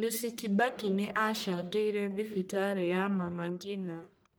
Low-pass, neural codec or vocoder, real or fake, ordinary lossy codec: none; codec, 44.1 kHz, 1.7 kbps, Pupu-Codec; fake; none